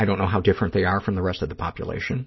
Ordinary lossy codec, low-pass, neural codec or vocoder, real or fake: MP3, 24 kbps; 7.2 kHz; none; real